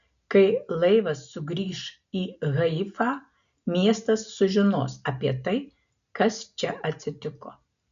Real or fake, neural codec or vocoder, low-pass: real; none; 7.2 kHz